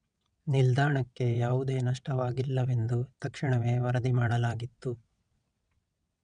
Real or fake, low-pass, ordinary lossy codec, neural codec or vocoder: fake; 9.9 kHz; none; vocoder, 22.05 kHz, 80 mel bands, WaveNeXt